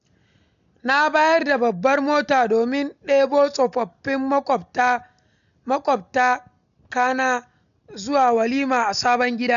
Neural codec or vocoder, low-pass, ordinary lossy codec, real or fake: none; 7.2 kHz; none; real